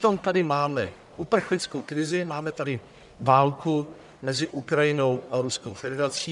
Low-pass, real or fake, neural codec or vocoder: 10.8 kHz; fake; codec, 44.1 kHz, 1.7 kbps, Pupu-Codec